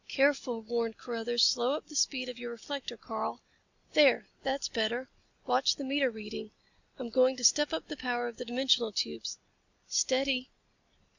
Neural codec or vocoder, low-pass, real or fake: none; 7.2 kHz; real